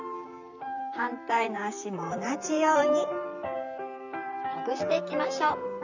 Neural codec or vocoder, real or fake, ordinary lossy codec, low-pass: vocoder, 44.1 kHz, 128 mel bands, Pupu-Vocoder; fake; none; 7.2 kHz